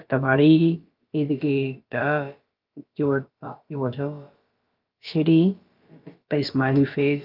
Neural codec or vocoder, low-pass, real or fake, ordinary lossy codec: codec, 16 kHz, about 1 kbps, DyCAST, with the encoder's durations; 5.4 kHz; fake; Opus, 32 kbps